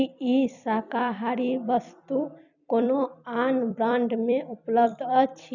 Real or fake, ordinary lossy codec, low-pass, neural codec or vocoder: fake; none; 7.2 kHz; vocoder, 44.1 kHz, 128 mel bands every 256 samples, BigVGAN v2